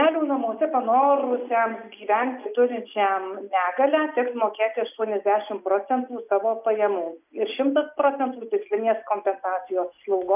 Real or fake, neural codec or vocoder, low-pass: real; none; 3.6 kHz